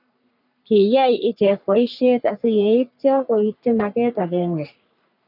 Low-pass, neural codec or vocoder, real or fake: 5.4 kHz; codec, 44.1 kHz, 3.4 kbps, Pupu-Codec; fake